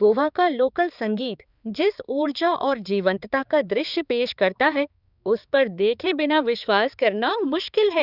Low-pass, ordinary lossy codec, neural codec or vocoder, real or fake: 5.4 kHz; Opus, 64 kbps; codec, 16 kHz, 4 kbps, X-Codec, HuBERT features, trained on balanced general audio; fake